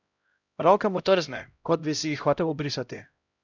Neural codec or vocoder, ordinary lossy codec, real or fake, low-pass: codec, 16 kHz, 0.5 kbps, X-Codec, HuBERT features, trained on LibriSpeech; none; fake; 7.2 kHz